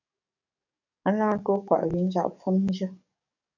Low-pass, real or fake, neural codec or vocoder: 7.2 kHz; fake; codec, 44.1 kHz, 7.8 kbps, DAC